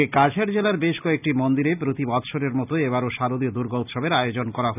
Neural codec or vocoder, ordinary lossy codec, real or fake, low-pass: none; none; real; 3.6 kHz